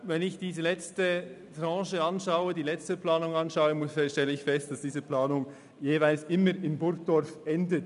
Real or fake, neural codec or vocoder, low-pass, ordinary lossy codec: real; none; 10.8 kHz; none